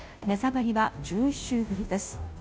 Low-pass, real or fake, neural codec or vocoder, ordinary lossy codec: none; fake; codec, 16 kHz, 0.5 kbps, FunCodec, trained on Chinese and English, 25 frames a second; none